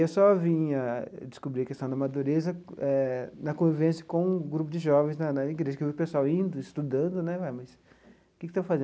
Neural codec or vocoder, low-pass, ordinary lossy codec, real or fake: none; none; none; real